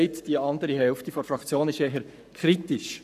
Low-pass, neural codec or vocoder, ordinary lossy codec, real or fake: 14.4 kHz; codec, 44.1 kHz, 7.8 kbps, Pupu-Codec; none; fake